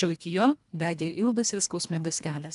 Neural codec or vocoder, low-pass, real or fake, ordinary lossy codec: codec, 24 kHz, 1.5 kbps, HILCodec; 10.8 kHz; fake; AAC, 96 kbps